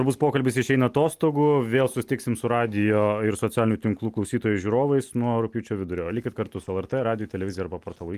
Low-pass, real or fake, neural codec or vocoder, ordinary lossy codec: 14.4 kHz; real; none; Opus, 32 kbps